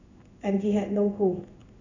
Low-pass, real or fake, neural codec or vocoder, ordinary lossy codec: 7.2 kHz; fake; codec, 16 kHz in and 24 kHz out, 1 kbps, XY-Tokenizer; none